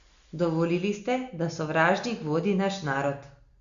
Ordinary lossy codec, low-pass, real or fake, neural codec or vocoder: Opus, 64 kbps; 7.2 kHz; real; none